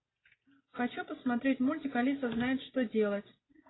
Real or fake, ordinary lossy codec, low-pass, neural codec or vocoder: real; AAC, 16 kbps; 7.2 kHz; none